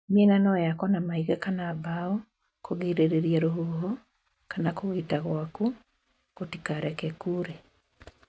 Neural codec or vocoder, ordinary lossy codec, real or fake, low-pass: none; none; real; none